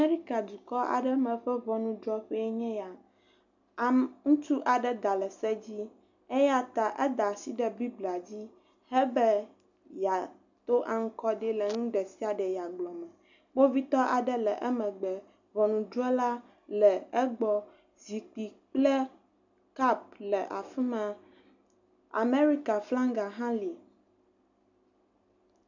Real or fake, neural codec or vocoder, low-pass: real; none; 7.2 kHz